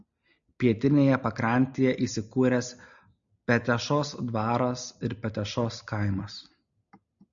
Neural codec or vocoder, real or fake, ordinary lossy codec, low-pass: none; real; MP3, 96 kbps; 7.2 kHz